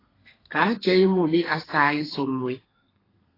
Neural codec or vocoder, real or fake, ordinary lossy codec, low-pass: codec, 32 kHz, 1.9 kbps, SNAC; fake; AAC, 24 kbps; 5.4 kHz